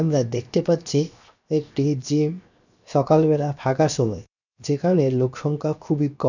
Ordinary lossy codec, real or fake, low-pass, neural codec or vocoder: none; fake; 7.2 kHz; codec, 16 kHz, 0.7 kbps, FocalCodec